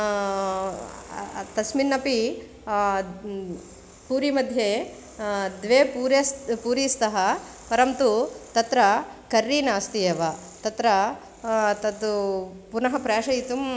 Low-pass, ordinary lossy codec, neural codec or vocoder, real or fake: none; none; none; real